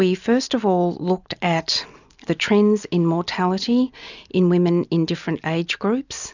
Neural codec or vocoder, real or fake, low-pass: none; real; 7.2 kHz